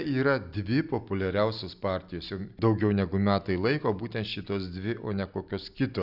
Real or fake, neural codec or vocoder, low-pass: real; none; 5.4 kHz